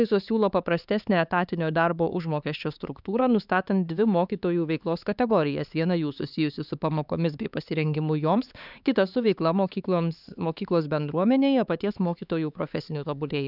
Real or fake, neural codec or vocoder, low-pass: fake; codec, 16 kHz, 4 kbps, X-Codec, HuBERT features, trained on LibriSpeech; 5.4 kHz